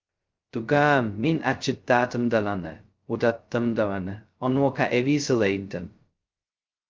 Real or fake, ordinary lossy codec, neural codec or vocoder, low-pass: fake; Opus, 24 kbps; codec, 16 kHz, 0.2 kbps, FocalCodec; 7.2 kHz